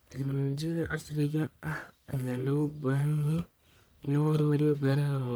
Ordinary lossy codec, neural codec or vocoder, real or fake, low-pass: none; codec, 44.1 kHz, 1.7 kbps, Pupu-Codec; fake; none